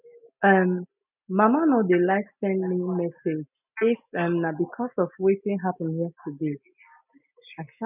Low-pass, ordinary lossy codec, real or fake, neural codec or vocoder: 3.6 kHz; none; real; none